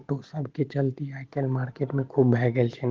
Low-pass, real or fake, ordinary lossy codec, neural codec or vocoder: 7.2 kHz; real; Opus, 16 kbps; none